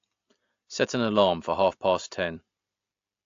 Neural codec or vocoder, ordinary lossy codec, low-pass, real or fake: none; AAC, 48 kbps; 7.2 kHz; real